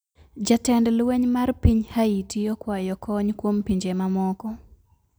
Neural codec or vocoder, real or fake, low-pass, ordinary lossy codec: none; real; none; none